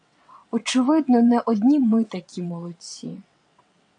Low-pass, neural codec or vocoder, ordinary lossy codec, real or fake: 9.9 kHz; vocoder, 22.05 kHz, 80 mel bands, WaveNeXt; AAC, 64 kbps; fake